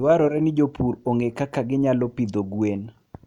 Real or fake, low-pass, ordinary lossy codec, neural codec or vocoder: real; 19.8 kHz; none; none